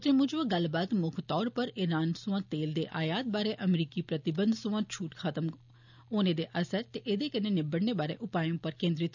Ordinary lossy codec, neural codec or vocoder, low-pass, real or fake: none; none; 7.2 kHz; real